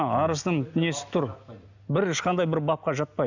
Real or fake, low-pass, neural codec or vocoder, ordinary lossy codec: real; 7.2 kHz; none; none